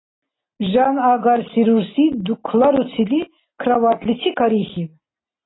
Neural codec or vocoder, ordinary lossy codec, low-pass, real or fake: none; AAC, 16 kbps; 7.2 kHz; real